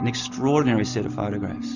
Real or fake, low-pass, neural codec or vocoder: real; 7.2 kHz; none